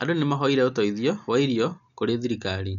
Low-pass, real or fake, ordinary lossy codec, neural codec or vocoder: 7.2 kHz; real; none; none